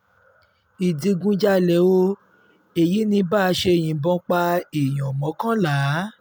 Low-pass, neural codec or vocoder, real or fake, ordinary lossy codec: none; none; real; none